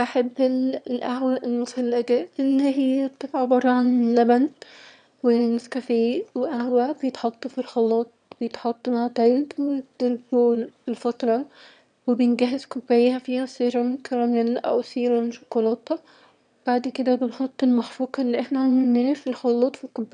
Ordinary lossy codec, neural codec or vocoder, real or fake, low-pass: none; autoencoder, 22.05 kHz, a latent of 192 numbers a frame, VITS, trained on one speaker; fake; 9.9 kHz